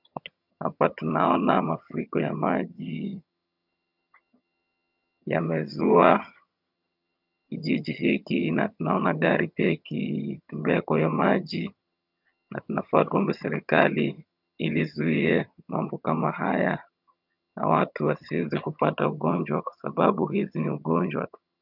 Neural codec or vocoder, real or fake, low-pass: vocoder, 22.05 kHz, 80 mel bands, HiFi-GAN; fake; 5.4 kHz